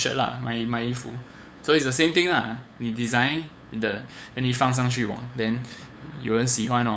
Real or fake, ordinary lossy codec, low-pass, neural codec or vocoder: fake; none; none; codec, 16 kHz, 8 kbps, FunCodec, trained on LibriTTS, 25 frames a second